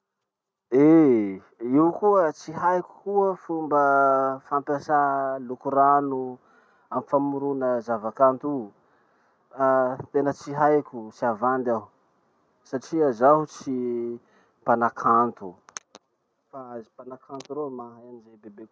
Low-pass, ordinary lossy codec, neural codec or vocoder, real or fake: none; none; none; real